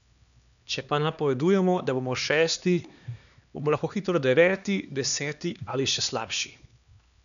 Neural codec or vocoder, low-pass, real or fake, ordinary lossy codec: codec, 16 kHz, 2 kbps, X-Codec, HuBERT features, trained on LibriSpeech; 7.2 kHz; fake; none